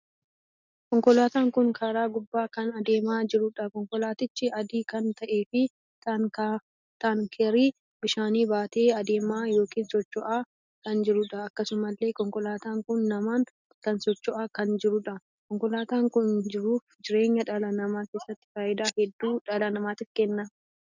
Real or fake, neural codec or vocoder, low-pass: real; none; 7.2 kHz